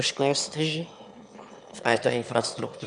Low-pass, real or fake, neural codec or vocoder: 9.9 kHz; fake; autoencoder, 22.05 kHz, a latent of 192 numbers a frame, VITS, trained on one speaker